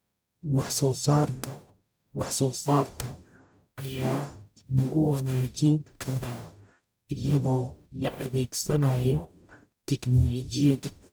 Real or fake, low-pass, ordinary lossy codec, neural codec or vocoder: fake; none; none; codec, 44.1 kHz, 0.9 kbps, DAC